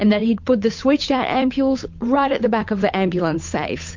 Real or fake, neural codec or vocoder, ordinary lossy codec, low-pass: fake; codec, 16 kHz, 8 kbps, FunCodec, trained on Chinese and English, 25 frames a second; MP3, 48 kbps; 7.2 kHz